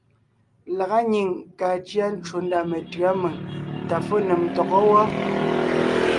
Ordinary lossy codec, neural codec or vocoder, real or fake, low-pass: Opus, 32 kbps; none; real; 10.8 kHz